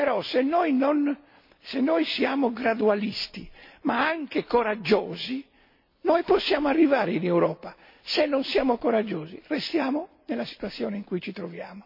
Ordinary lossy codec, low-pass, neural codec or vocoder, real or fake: MP3, 32 kbps; 5.4 kHz; none; real